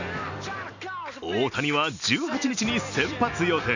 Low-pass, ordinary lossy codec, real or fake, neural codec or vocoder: 7.2 kHz; none; real; none